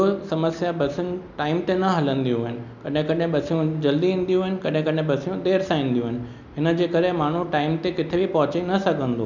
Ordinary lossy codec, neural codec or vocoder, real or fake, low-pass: none; none; real; 7.2 kHz